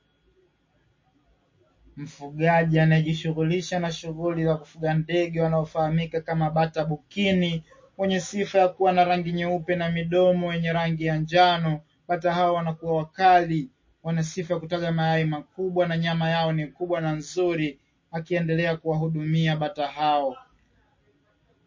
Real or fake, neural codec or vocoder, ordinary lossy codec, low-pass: real; none; MP3, 32 kbps; 7.2 kHz